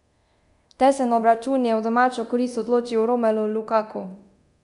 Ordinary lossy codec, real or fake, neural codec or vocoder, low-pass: none; fake; codec, 24 kHz, 0.9 kbps, DualCodec; 10.8 kHz